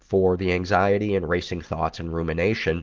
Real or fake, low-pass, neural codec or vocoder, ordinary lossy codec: real; 7.2 kHz; none; Opus, 16 kbps